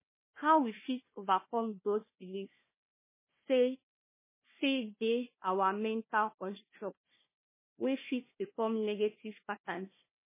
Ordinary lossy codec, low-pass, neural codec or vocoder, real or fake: MP3, 16 kbps; 3.6 kHz; codec, 16 kHz, 1 kbps, FunCodec, trained on Chinese and English, 50 frames a second; fake